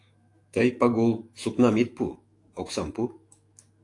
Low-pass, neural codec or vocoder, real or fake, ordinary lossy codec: 10.8 kHz; autoencoder, 48 kHz, 128 numbers a frame, DAC-VAE, trained on Japanese speech; fake; AAC, 48 kbps